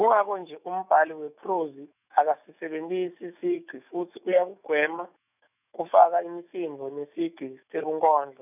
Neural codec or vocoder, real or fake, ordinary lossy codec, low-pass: autoencoder, 48 kHz, 128 numbers a frame, DAC-VAE, trained on Japanese speech; fake; none; 3.6 kHz